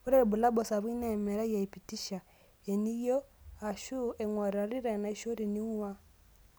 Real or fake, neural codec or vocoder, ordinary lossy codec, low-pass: real; none; none; none